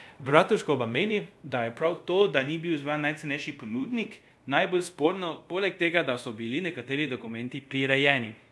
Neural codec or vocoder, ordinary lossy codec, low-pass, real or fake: codec, 24 kHz, 0.5 kbps, DualCodec; none; none; fake